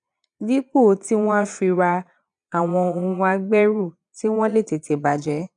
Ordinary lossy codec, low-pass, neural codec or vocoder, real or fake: none; 9.9 kHz; vocoder, 22.05 kHz, 80 mel bands, Vocos; fake